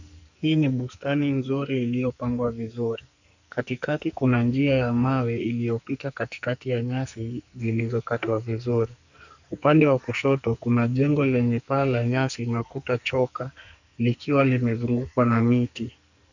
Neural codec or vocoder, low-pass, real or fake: codec, 44.1 kHz, 2.6 kbps, SNAC; 7.2 kHz; fake